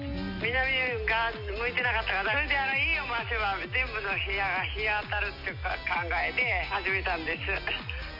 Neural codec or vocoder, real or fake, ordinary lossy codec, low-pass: none; real; none; 5.4 kHz